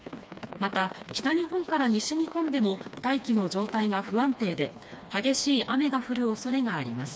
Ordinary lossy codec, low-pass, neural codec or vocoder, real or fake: none; none; codec, 16 kHz, 2 kbps, FreqCodec, smaller model; fake